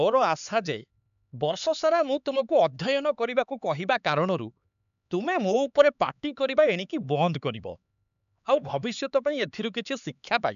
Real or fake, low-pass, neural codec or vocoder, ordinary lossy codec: fake; 7.2 kHz; codec, 16 kHz, 4 kbps, X-Codec, HuBERT features, trained on LibriSpeech; none